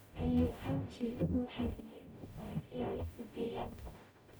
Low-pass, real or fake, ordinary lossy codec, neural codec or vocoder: none; fake; none; codec, 44.1 kHz, 0.9 kbps, DAC